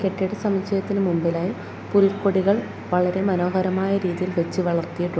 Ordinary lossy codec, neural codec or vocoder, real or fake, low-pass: none; none; real; none